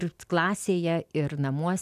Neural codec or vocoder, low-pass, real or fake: none; 14.4 kHz; real